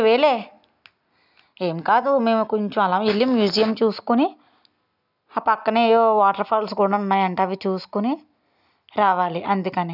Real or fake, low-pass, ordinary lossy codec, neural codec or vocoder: real; 5.4 kHz; none; none